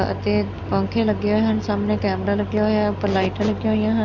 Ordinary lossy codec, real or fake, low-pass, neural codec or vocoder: none; real; 7.2 kHz; none